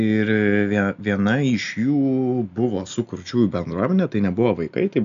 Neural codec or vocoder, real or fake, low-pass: none; real; 7.2 kHz